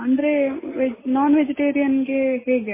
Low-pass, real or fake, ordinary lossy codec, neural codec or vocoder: 3.6 kHz; real; MP3, 16 kbps; none